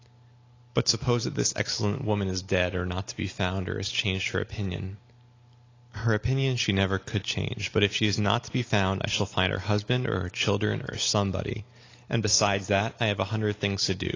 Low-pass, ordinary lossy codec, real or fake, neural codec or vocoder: 7.2 kHz; AAC, 32 kbps; real; none